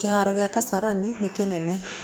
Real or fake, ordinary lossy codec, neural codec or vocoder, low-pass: fake; none; codec, 44.1 kHz, 2.6 kbps, DAC; none